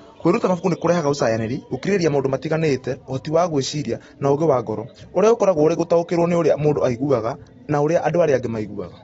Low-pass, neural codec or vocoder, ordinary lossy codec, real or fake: 19.8 kHz; none; AAC, 24 kbps; real